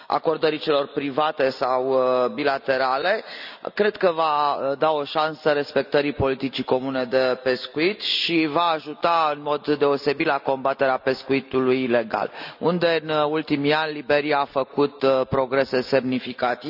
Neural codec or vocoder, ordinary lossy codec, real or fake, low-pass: none; none; real; 5.4 kHz